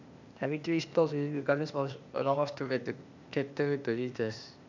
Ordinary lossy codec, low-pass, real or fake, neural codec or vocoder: none; 7.2 kHz; fake; codec, 16 kHz, 0.8 kbps, ZipCodec